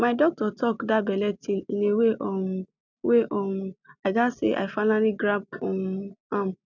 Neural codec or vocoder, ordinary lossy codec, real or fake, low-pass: none; none; real; 7.2 kHz